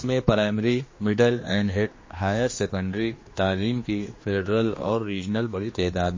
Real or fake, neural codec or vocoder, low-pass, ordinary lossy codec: fake; codec, 16 kHz, 2 kbps, X-Codec, HuBERT features, trained on general audio; 7.2 kHz; MP3, 32 kbps